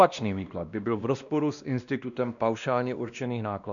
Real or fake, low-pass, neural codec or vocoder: fake; 7.2 kHz; codec, 16 kHz, 1 kbps, X-Codec, WavLM features, trained on Multilingual LibriSpeech